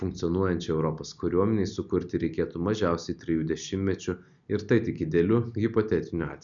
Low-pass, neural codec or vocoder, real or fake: 7.2 kHz; none; real